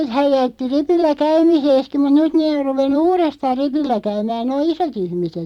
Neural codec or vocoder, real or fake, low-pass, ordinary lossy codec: vocoder, 44.1 kHz, 128 mel bands every 256 samples, BigVGAN v2; fake; 19.8 kHz; none